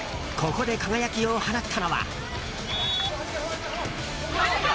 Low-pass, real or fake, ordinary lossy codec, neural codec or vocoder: none; real; none; none